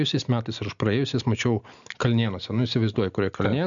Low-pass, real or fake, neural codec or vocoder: 7.2 kHz; real; none